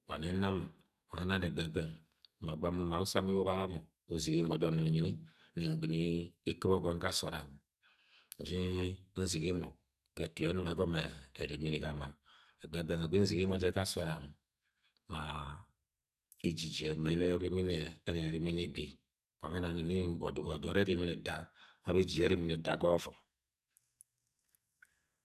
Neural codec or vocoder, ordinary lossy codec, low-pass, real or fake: codec, 44.1 kHz, 2.6 kbps, SNAC; none; 14.4 kHz; fake